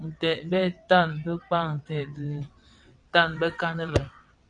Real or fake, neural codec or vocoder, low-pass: fake; vocoder, 22.05 kHz, 80 mel bands, WaveNeXt; 9.9 kHz